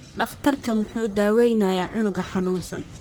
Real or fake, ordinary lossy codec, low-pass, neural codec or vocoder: fake; none; none; codec, 44.1 kHz, 1.7 kbps, Pupu-Codec